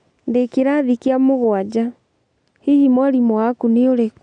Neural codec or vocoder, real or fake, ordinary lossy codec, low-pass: none; real; none; 9.9 kHz